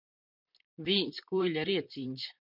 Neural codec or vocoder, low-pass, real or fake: vocoder, 44.1 kHz, 128 mel bands, Pupu-Vocoder; 5.4 kHz; fake